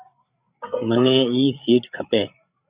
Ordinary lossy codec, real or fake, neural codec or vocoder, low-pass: Opus, 64 kbps; fake; codec, 16 kHz, 8 kbps, FreqCodec, larger model; 3.6 kHz